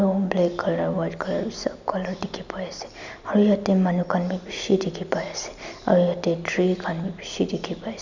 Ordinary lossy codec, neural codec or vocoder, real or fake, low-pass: none; none; real; 7.2 kHz